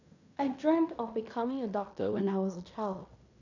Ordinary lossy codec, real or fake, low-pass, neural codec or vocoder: none; fake; 7.2 kHz; codec, 16 kHz in and 24 kHz out, 0.9 kbps, LongCat-Audio-Codec, fine tuned four codebook decoder